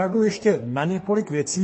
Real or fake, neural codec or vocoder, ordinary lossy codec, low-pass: fake; codec, 24 kHz, 1 kbps, SNAC; MP3, 32 kbps; 9.9 kHz